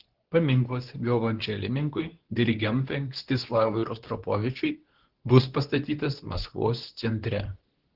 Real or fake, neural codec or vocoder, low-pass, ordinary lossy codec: fake; codec, 24 kHz, 0.9 kbps, WavTokenizer, medium speech release version 2; 5.4 kHz; Opus, 16 kbps